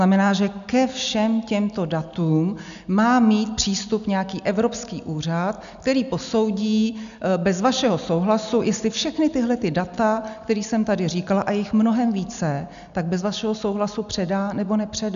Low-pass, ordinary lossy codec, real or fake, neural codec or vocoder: 7.2 kHz; MP3, 96 kbps; real; none